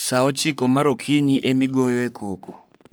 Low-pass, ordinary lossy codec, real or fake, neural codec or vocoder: none; none; fake; codec, 44.1 kHz, 3.4 kbps, Pupu-Codec